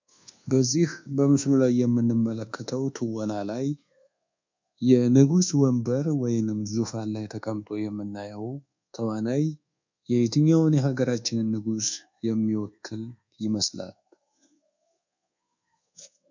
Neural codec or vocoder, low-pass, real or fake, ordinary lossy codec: codec, 24 kHz, 1.2 kbps, DualCodec; 7.2 kHz; fake; MP3, 64 kbps